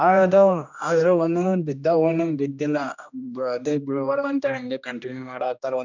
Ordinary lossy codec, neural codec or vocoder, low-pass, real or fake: none; codec, 16 kHz, 1 kbps, X-Codec, HuBERT features, trained on general audio; 7.2 kHz; fake